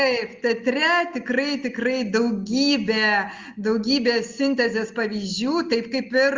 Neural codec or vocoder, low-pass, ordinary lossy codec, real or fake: none; 7.2 kHz; Opus, 32 kbps; real